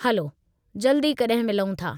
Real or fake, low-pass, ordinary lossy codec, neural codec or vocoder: real; 19.8 kHz; none; none